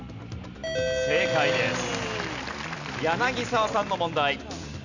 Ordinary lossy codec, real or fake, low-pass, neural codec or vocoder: none; real; 7.2 kHz; none